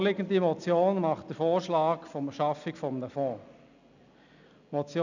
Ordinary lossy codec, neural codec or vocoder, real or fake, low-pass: none; none; real; 7.2 kHz